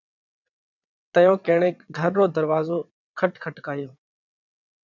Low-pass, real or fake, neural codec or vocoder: 7.2 kHz; fake; vocoder, 22.05 kHz, 80 mel bands, WaveNeXt